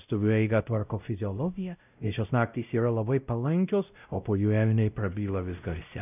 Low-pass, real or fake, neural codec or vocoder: 3.6 kHz; fake; codec, 16 kHz, 0.5 kbps, X-Codec, WavLM features, trained on Multilingual LibriSpeech